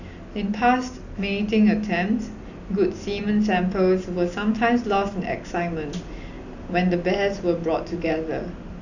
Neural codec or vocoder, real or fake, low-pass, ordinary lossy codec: none; real; 7.2 kHz; none